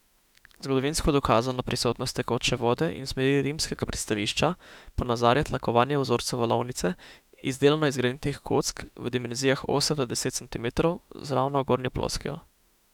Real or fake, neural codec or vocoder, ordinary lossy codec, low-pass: fake; autoencoder, 48 kHz, 32 numbers a frame, DAC-VAE, trained on Japanese speech; none; 19.8 kHz